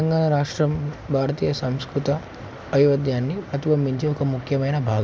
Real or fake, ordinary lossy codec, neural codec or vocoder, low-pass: real; none; none; none